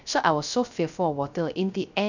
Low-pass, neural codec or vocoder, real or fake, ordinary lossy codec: 7.2 kHz; codec, 16 kHz, 0.3 kbps, FocalCodec; fake; none